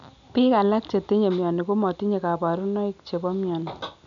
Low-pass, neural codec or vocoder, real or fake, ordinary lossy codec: 7.2 kHz; none; real; none